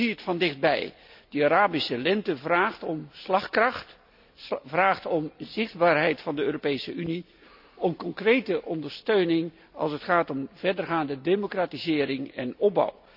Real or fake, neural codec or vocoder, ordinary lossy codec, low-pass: real; none; none; 5.4 kHz